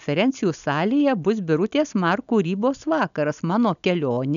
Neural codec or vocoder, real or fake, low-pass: none; real; 7.2 kHz